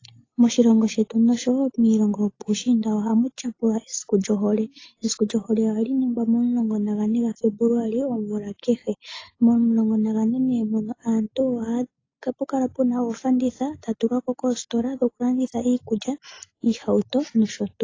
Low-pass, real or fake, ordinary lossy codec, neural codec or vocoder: 7.2 kHz; real; AAC, 32 kbps; none